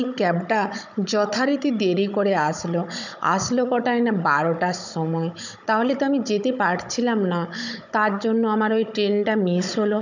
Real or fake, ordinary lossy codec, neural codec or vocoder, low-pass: fake; none; codec, 16 kHz, 16 kbps, FunCodec, trained on Chinese and English, 50 frames a second; 7.2 kHz